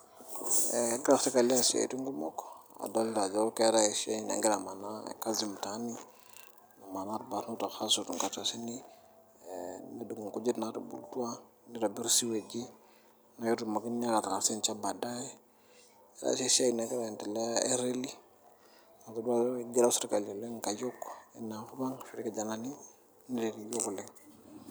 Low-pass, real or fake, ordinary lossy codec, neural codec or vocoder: none; real; none; none